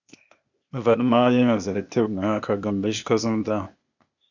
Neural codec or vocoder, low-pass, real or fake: codec, 16 kHz, 0.8 kbps, ZipCodec; 7.2 kHz; fake